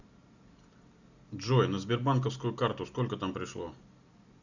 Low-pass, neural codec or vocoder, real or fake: 7.2 kHz; none; real